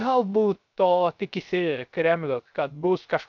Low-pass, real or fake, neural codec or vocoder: 7.2 kHz; fake; codec, 16 kHz, 0.3 kbps, FocalCodec